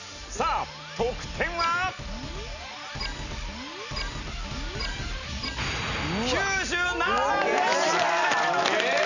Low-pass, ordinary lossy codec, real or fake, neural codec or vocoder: 7.2 kHz; none; real; none